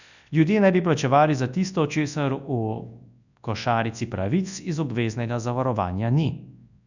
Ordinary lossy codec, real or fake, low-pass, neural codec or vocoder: none; fake; 7.2 kHz; codec, 24 kHz, 0.9 kbps, WavTokenizer, large speech release